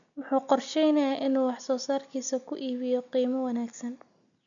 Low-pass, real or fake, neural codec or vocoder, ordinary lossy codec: 7.2 kHz; real; none; none